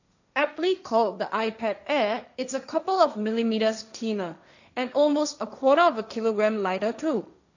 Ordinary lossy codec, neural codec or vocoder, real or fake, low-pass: none; codec, 16 kHz, 1.1 kbps, Voila-Tokenizer; fake; 7.2 kHz